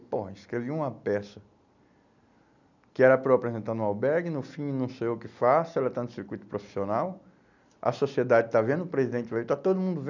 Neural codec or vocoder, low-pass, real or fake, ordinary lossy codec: none; 7.2 kHz; real; none